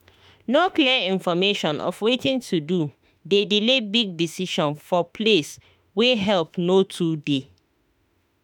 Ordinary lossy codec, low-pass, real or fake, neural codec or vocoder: none; none; fake; autoencoder, 48 kHz, 32 numbers a frame, DAC-VAE, trained on Japanese speech